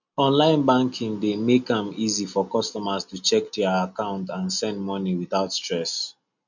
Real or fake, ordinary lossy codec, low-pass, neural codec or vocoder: real; none; 7.2 kHz; none